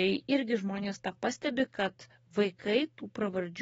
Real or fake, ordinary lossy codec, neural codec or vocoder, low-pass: fake; AAC, 24 kbps; codec, 44.1 kHz, 7.8 kbps, DAC; 19.8 kHz